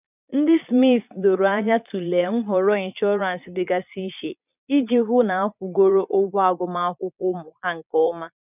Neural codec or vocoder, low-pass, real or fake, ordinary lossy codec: vocoder, 22.05 kHz, 80 mel bands, Vocos; 3.6 kHz; fake; none